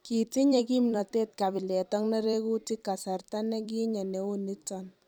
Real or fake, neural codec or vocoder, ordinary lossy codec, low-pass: fake; vocoder, 44.1 kHz, 128 mel bands every 256 samples, BigVGAN v2; none; none